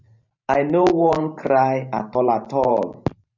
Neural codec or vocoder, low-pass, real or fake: vocoder, 44.1 kHz, 128 mel bands every 512 samples, BigVGAN v2; 7.2 kHz; fake